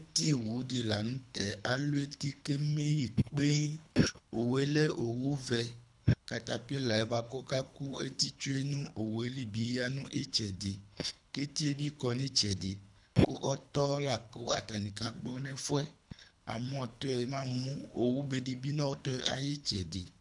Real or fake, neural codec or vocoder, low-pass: fake; codec, 24 kHz, 3 kbps, HILCodec; 10.8 kHz